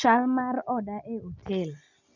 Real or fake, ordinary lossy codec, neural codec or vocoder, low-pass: real; none; none; 7.2 kHz